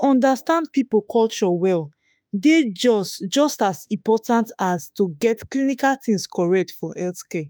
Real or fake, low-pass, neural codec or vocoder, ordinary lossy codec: fake; none; autoencoder, 48 kHz, 32 numbers a frame, DAC-VAE, trained on Japanese speech; none